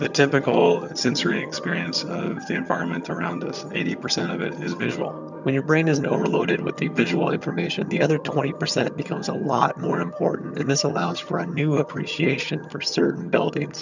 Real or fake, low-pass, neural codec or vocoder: fake; 7.2 kHz; vocoder, 22.05 kHz, 80 mel bands, HiFi-GAN